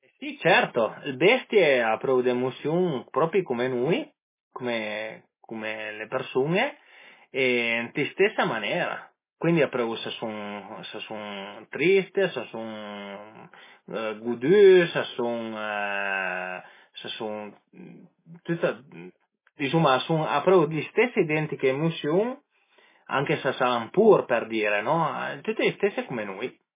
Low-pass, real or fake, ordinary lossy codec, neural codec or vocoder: 3.6 kHz; real; MP3, 16 kbps; none